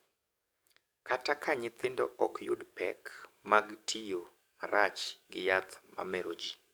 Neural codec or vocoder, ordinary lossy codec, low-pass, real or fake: codec, 44.1 kHz, 7.8 kbps, DAC; none; none; fake